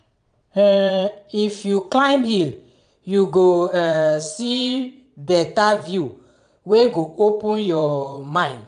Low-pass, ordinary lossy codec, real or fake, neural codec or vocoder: 9.9 kHz; none; fake; vocoder, 22.05 kHz, 80 mel bands, Vocos